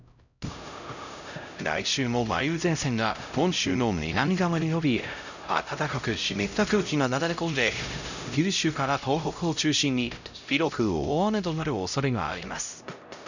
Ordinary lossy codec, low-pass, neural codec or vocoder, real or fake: none; 7.2 kHz; codec, 16 kHz, 0.5 kbps, X-Codec, HuBERT features, trained on LibriSpeech; fake